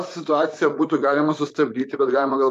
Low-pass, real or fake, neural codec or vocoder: 14.4 kHz; fake; vocoder, 44.1 kHz, 128 mel bands, Pupu-Vocoder